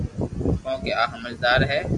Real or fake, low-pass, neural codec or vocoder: real; 10.8 kHz; none